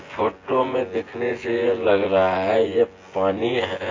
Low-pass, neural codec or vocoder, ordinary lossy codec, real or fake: 7.2 kHz; vocoder, 24 kHz, 100 mel bands, Vocos; AAC, 32 kbps; fake